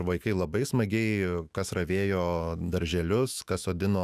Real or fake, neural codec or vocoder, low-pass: fake; autoencoder, 48 kHz, 128 numbers a frame, DAC-VAE, trained on Japanese speech; 14.4 kHz